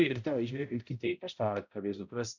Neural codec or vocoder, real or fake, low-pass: codec, 16 kHz, 0.5 kbps, X-Codec, HuBERT features, trained on balanced general audio; fake; 7.2 kHz